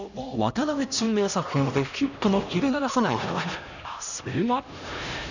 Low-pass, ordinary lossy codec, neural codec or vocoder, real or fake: 7.2 kHz; none; codec, 16 kHz, 1 kbps, X-Codec, HuBERT features, trained on LibriSpeech; fake